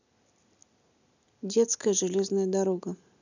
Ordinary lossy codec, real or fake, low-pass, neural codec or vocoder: none; real; 7.2 kHz; none